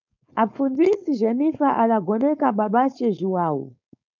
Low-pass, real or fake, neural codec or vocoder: 7.2 kHz; fake; codec, 16 kHz, 4.8 kbps, FACodec